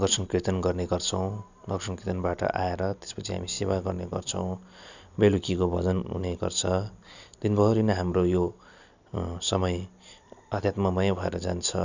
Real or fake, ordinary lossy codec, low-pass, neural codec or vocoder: real; none; 7.2 kHz; none